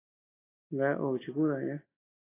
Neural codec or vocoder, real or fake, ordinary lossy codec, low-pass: none; real; AAC, 16 kbps; 3.6 kHz